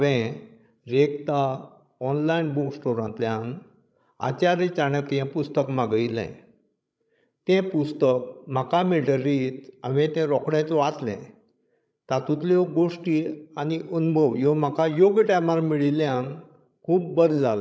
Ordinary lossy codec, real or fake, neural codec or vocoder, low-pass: none; fake; codec, 16 kHz, 16 kbps, FreqCodec, larger model; none